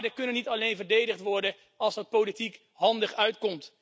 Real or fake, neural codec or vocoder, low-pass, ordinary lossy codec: real; none; none; none